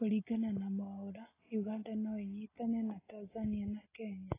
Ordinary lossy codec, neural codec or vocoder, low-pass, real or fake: AAC, 16 kbps; none; 3.6 kHz; real